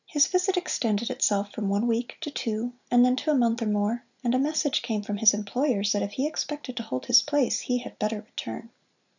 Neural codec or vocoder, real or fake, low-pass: none; real; 7.2 kHz